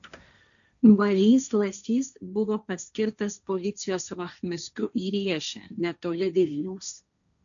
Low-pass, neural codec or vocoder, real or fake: 7.2 kHz; codec, 16 kHz, 1.1 kbps, Voila-Tokenizer; fake